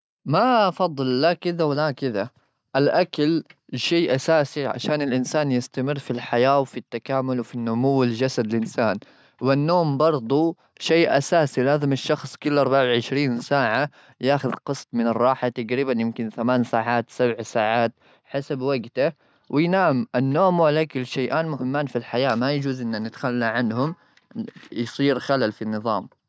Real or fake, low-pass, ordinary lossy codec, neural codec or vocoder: real; none; none; none